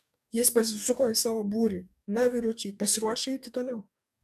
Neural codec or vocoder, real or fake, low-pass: codec, 44.1 kHz, 2.6 kbps, DAC; fake; 14.4 kHz